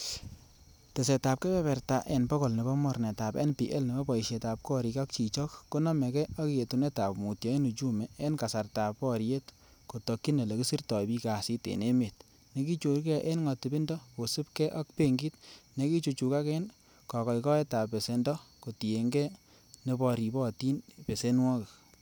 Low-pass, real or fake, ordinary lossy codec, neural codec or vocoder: none; real; none; none